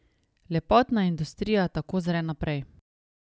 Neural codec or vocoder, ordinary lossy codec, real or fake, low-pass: none; none; real; none